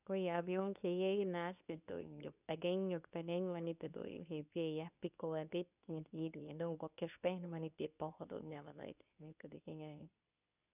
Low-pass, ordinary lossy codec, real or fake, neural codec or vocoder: 3.6 kHz; none; fake; codec, 24 kHz, 0.9 kbps, WavTokenizer, medium speech release version 2